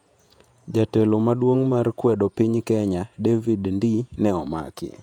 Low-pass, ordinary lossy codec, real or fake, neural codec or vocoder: 19.8 kHz; none; fake; vocoder, 48 kHz, 128 mel bands, Vocos